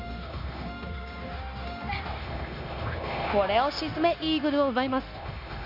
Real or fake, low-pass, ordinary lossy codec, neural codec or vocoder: fake; 5.4 kHz; none; codec, 16 kHz, 0.9 kbps, LongCat-Audio-Codec